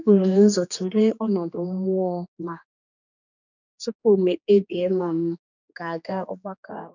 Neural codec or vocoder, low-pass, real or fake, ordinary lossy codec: codec, 16 kHz, 2 kbps, X-Codec, HuBERT features, trained on general audio; 7.2 kHz; fake; none